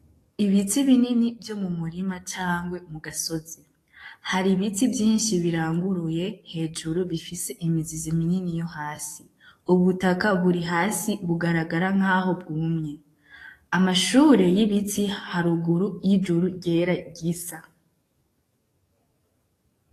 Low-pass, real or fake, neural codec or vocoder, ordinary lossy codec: 14.4 kHz; fake; codec, 44.1 kHz, 7.8 kbps, DAC; AAC, 48 kbps